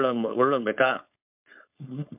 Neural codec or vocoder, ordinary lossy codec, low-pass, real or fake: codec, 16 kHz, 4.8 kbps, FACodec; MP3, 32 kbps; 3.6 kHz; fake